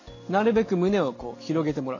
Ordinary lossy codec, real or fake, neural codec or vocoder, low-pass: none; real; none; 7.2 kHz